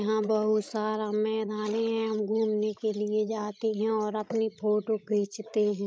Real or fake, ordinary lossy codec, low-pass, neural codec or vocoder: fake; none; none; codec, 16 kHz, 16 kbps, FreqCodec, larger model